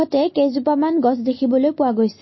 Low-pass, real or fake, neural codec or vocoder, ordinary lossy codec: 7.2 kHz; real; none; MP3, 24 kbps